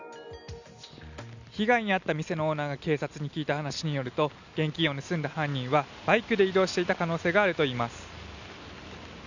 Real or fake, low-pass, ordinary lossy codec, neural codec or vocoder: real; 7.2 kHz; none; none